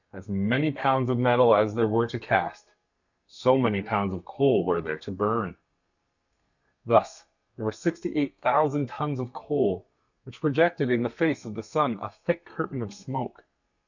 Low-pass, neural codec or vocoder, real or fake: 7.2 kHz; codec, 32 kHz, 1.9 kbps, SNAC; fake